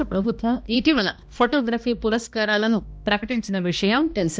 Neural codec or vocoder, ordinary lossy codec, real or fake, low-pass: codec, 16 kHz, 1 kbps, X-Codec, HuBERT features, trained on balanced general audio; none; fake; none